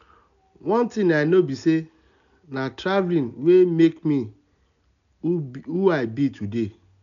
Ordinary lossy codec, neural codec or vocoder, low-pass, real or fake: none; none; 7.2 kHz; real